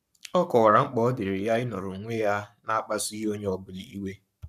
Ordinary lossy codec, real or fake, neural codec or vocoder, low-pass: none; fake; codec, 44.1 kHz, 7.8 kbps, Pupu-Codec; 14.4 kHz